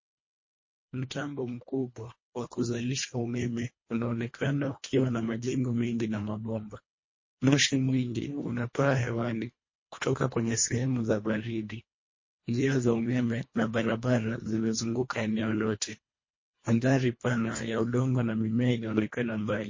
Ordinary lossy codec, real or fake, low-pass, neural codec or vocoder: MP3, 32 kbps; fake; 7.2 kHz; codec, 24 kHz, 1.5 kbps, HILCodec